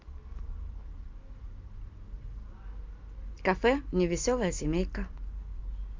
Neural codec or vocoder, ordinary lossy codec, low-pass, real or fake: none; Opus, 24 kbps; 7.2 kHz; real